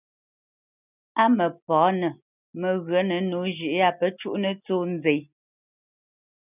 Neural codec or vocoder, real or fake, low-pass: none; real; 3.6 kHz